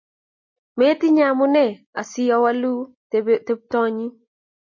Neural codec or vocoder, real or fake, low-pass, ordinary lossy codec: none; real; 7.2 kHz; MP3, 32 kbps